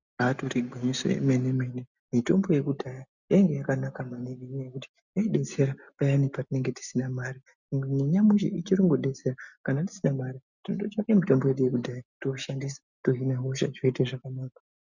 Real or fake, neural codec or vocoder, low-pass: real; none; 7.2 kHz